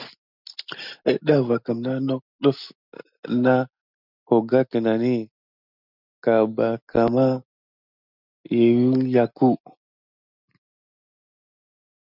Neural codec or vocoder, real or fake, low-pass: none; real; 5.4 kHz